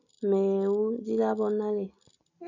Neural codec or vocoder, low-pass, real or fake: none; 7.2 kHz; real